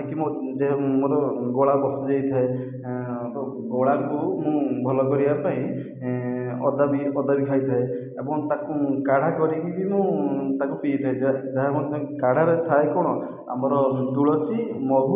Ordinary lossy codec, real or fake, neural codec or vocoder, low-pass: none; real; none; 3.6 kHz